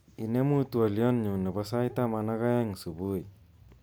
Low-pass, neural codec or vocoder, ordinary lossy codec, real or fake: none; none; none; real